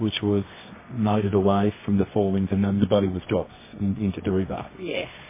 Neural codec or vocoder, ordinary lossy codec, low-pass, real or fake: codec, 24 kHz, 0.9 kbps, WavTokenizer, medium music audio release; MP3, 16 kbps; 3.6 kHz; fake